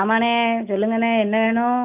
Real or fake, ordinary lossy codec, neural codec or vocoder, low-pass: real; none; none; 3.6 kHz